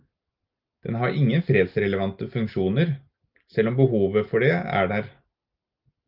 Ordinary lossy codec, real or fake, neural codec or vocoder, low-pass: Opus, 24 kbps; real; none; 5.4 kHz